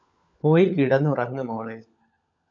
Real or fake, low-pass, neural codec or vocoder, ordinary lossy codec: fake; 7.2 kHz; codec, 16 kHz, 16 kbps, FunCodec, trained on LibriTTS, 50 frames a second; MP3, 96 kbps